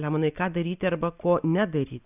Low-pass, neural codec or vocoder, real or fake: 3.6 kHz; none; real